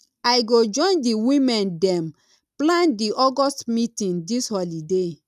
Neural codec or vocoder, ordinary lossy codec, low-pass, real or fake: none; none; 14.4 kHz; real